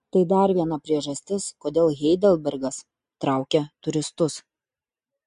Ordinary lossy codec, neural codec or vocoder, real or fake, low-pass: MP3, 48 kbps; none; real; 14.4 kHz